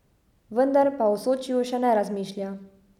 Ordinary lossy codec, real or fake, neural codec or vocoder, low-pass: none; real; none; 19.8 kHz